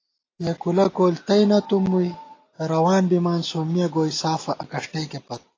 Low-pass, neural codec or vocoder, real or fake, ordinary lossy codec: 7.2 kHz; none; real; AAC, 32 kbps